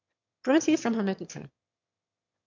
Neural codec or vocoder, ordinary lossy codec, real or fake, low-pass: autoencoder, 22.05 kHz, a latent of 192 numbers a frame, VITS, trained on one speaker; MP3, 64 kbps; fake; 7.2 kHz